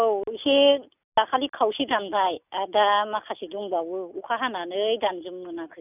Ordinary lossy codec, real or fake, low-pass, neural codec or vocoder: none; real; 3.6 kHz; none